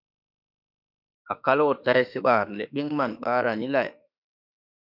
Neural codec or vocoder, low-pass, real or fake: autoencoder, 48 kHz, 32 numbers a frame, DAC-VAE, trained on Japanese speech; 5.4 kHz; fake